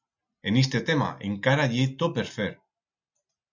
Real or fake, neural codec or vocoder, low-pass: real; none; 7.2 kHz